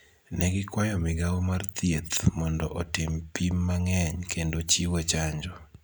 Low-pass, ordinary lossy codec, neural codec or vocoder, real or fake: none; none; none; real